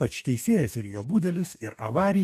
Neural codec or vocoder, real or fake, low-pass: codec, 44.1 kHz, 2.6 kbps, DAC; fake; 14.4 kHz